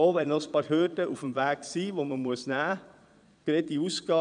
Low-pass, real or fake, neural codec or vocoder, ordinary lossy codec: 9.9 kHz; fake; vocoder, 22.05 kHz, 80 mel bands, Vocos; none